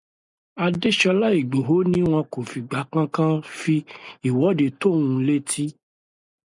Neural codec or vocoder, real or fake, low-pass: none; real; 10.8 kHz